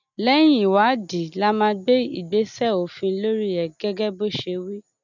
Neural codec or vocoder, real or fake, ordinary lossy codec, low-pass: none; real; none; 7.2 kHz